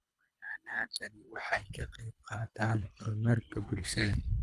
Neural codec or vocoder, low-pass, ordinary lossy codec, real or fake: codec, 24 kHz, 3 kbps, HILCodec; none; none; fake